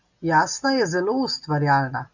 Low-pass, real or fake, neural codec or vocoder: 7.2 kHz; real; none